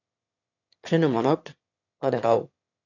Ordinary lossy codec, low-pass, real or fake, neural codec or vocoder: AAC, 48 kbps; 7.2 kHz; fake; autoencoder, 22.05 kHz, a latent of 192 numbers a frame, VITS, trained on one speaker